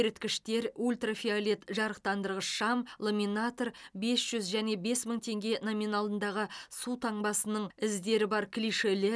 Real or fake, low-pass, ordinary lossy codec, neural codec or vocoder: real; none; none; none